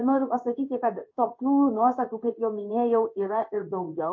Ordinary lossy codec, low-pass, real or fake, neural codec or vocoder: MP3, 32 kbps; 7.2 kHz; fake; codec, 16 kHz in and 24 kHz out, 1 kbps, XY-Tokenizer